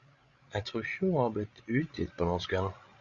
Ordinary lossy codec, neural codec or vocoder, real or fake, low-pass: Opus, 64 kbps; codec, 16 kHz, 16 kbps, FreqCodec, larger model; fake; 7.2 kHz